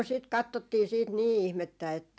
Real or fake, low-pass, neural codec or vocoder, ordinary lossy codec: real; none; none; none